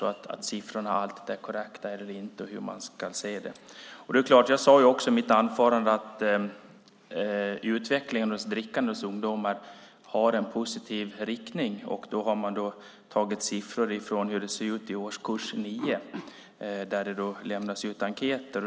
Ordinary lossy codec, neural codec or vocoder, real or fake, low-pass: none; none; real; none